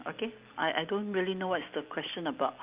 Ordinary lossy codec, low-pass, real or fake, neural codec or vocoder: Opus, 24 kbps; 3.6 kHz; real; none